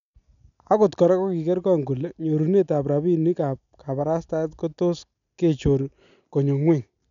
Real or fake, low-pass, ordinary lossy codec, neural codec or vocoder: real; 7.2 kHz; none; none